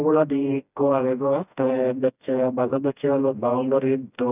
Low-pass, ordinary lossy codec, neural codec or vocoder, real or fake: 3.6 kHz; none; codec, 16 kHz, 1 kbps, FreqCodec, smaller model; fake